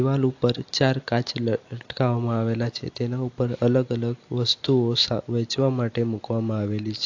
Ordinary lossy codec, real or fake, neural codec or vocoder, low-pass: AAC, 48 kbps; real; none; 7.2 kHz